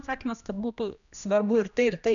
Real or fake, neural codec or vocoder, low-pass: fake; codec, 16 kHz, 1 kbps, X-Codec, HuBERT features, trained on general audio; 7.2 kHz